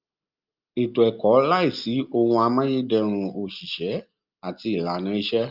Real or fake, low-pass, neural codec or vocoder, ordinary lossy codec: real; 5.4 kHz; none; Opus, 24 kbps